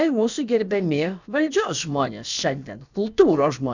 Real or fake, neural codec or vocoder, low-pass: fake; codec, 16 kHz, about 1 kbps, DyCAST, with the encoder's durations; 7.2 kHz